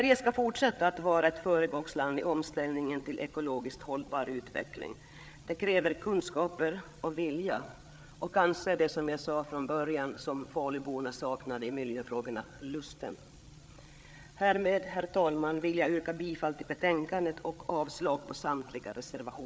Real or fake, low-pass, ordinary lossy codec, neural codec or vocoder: fake; none; none; codec, 16 kHz, 8 kbps, FreqCodec, larger model